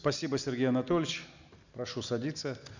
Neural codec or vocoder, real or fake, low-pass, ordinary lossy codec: none; real; 7.2 kHz; none